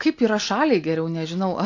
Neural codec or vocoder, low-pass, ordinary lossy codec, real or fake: none; 7.2 kHz; AAC, 48 kbps; real